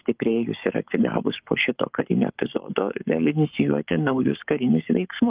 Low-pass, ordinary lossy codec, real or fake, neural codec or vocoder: 3.6 kHz; Opus, 32 kbps; fake; codec, 44.1 kHz, 7.8 kbps, Pupu-Codec